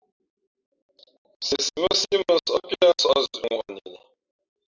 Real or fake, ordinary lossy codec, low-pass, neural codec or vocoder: real; Opus, 64 kbps; 7.2 kHz; none